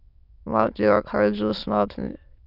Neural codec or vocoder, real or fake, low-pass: autoencoder, 22.05 kHz, a latent of 192 numbers a frame, VITS, trained on many speakers; fake; 5.4 kHz